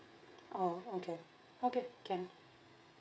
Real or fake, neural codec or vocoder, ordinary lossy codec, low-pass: fake; codec, 16 kHz, 16 kbps, FreqCodec, smaller model; none; none